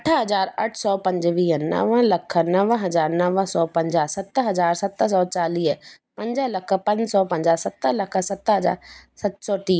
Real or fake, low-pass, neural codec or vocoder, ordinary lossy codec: real; none; none; none